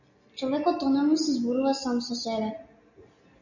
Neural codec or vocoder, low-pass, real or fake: none; 7.2 kHz; real